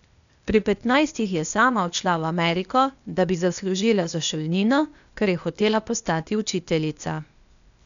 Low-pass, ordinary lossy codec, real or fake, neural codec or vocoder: 7.2 kHz; none; fake; codec, 16 kHz, 0.8 kbps, ZipCodec